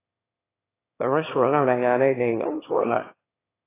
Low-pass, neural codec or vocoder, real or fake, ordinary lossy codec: 3.6 kHz; autoencoder, 22.05 kHz, a latent of 192 numbers a frame, VITS, trained on one speaker; fake; AAC, 16 kbps